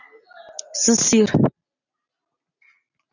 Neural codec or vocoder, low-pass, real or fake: none; 7.2 kHz; real